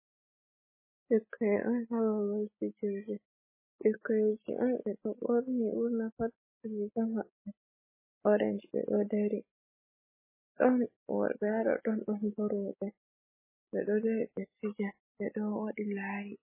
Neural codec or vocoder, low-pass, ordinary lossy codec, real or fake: none; 3.6 kHz; MP3, 16 kbps; real